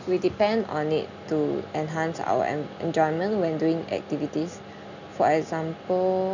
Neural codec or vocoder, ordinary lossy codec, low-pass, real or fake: none; none; 7.2 kHz; real